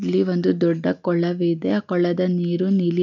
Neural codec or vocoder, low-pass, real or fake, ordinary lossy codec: none; 7.2 kHz; real; none